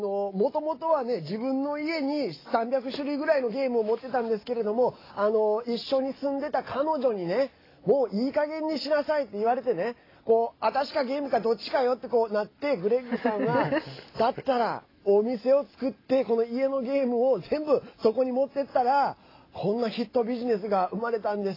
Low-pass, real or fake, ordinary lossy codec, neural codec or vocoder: 5.4 kHz; real; AAC, 24 kbps; none